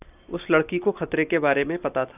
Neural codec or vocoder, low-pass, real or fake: none; 3.6 kHz; real